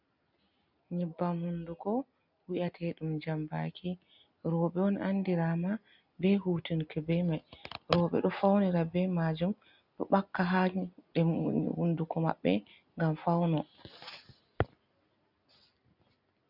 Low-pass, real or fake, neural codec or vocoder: 5.4 kHz; real; none